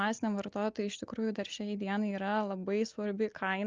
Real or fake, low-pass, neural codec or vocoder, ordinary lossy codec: real; 7.2 kHz; none; Opus, 24 kbps